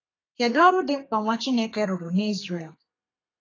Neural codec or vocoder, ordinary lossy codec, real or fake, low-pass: codec, 32 kHz, 1.9 kbps, SNAC; AAC, 32 kbps; fake; 7.2 kHz